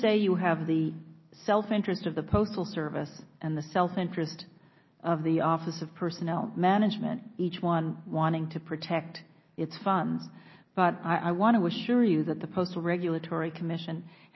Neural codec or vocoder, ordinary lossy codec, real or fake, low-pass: none; MP3, 24 kbps; real; 7.2 kHz